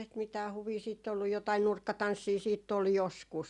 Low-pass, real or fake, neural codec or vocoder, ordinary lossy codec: 10.8 kHz; real; none; Opus, 64 kbps